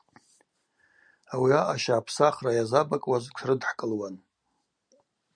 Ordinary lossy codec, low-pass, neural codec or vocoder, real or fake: MP3, 96 kbps; 9.9 kHz; none; real